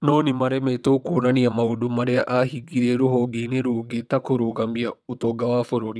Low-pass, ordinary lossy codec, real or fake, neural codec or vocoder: none; none; fake; vocoder, 22.05 kHz, 80 mel bands, WaveNeXt